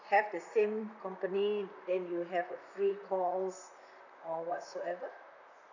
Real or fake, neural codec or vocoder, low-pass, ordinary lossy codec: fake; vocoder, 44.1 kHz, 128 mel bands, Pupu-Vocoder; 7.2 kHz; none